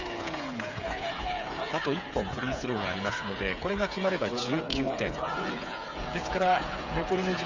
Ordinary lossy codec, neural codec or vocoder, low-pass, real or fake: none; codec, 16 kHz, 8 kbps, FreqCodec, smaller model; 7.2 kHz; fake